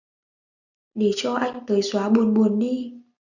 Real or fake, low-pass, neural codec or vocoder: real; 7.2 kHz; none